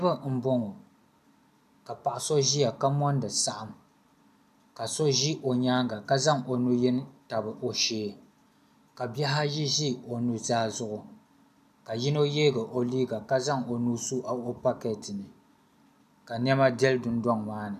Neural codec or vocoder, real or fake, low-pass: none; real; 14.4 kHz